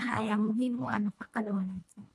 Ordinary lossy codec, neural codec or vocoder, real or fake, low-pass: none; codec, 24 kHz, 1.5 kbps, HILCodec; fake; none